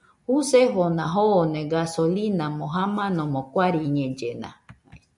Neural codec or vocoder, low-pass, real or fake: none; 10.8 kHz; real